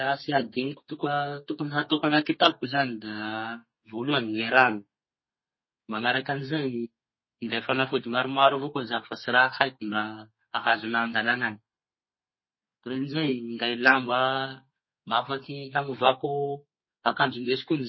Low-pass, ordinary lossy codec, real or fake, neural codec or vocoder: 7.2 kHz; MP3, 24 kbps; fake; codec, 32 kHz, 1.9 kbps, SNAC